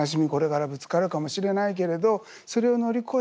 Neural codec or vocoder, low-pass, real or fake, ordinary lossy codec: none; none; real; none